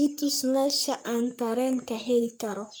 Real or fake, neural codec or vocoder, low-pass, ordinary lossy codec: fake; codec, 44.1 kHz, 3.4 kbps, Pupu-Codec; none; none